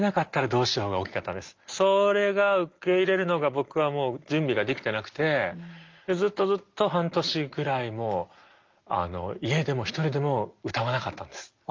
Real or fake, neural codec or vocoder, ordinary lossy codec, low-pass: real; none; Opus, 32 kbps; 7.2 kHz